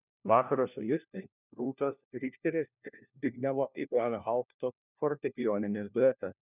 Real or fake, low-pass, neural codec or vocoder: fake; 3.6 kHz; codec, 16 kHz, 1 kbps, FunCodec, trained on LibriTTS, 50 frames a second